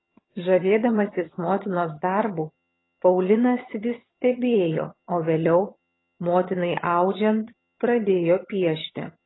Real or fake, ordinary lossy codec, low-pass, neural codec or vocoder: fake; AAC, 16 kbps; 7.2 kHz; vocoder, 22.05 kHz, 80 mel bands, HiFi-GAN